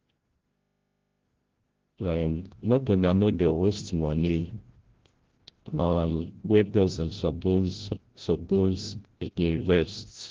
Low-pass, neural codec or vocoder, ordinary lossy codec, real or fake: 7.2 kHz; codec, 16 kHz, 0.5 kbps, FreqCodec, larger model; Opus, 16 kbps; fake